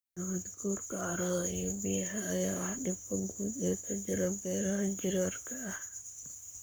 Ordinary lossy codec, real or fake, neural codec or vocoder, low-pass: none; fake; codec, 44.1 kHz, 7.8 kbps, Pupu-Codec; none